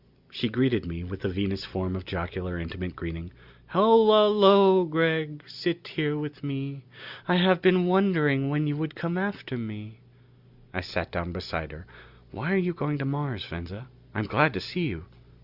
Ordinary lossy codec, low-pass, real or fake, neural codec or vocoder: Opus, 64 kbps; 5.4 kHz; real; none